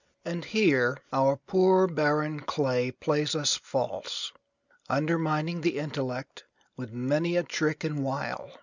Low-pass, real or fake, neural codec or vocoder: 7.2 kHz; real; none